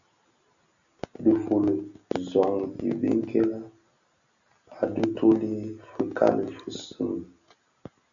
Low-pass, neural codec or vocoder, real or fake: 7.2 kHz; none; real